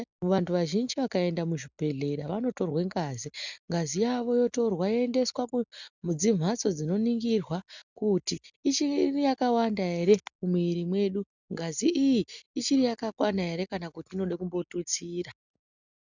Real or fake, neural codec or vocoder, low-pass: real; none; 7.2 kHz